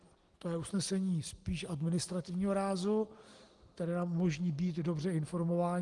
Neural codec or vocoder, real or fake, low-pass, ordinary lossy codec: none; real; 10.8 kHz; Opus, 24 kbps